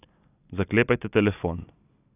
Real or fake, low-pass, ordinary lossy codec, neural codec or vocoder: real; 3.6 kHz; none; none